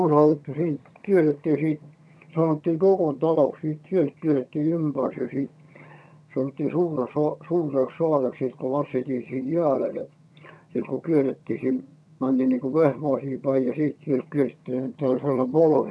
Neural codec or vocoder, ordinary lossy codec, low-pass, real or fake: vocoder, 22.05 kHz, 80 mel bands, HiFi-GAN; none; none; fake